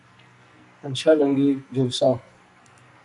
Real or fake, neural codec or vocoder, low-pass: fake; codec, 44.1 kHz, 2.6 kbps, SNAC; 10.8 kHz